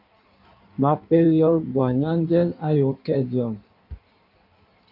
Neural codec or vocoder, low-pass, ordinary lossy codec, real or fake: codec, 16 kHz in and 24 kHz out, 1.1 kbps, FireRedTTS-2 codec; 5.4 kHz; AAC, 48 kbps; fake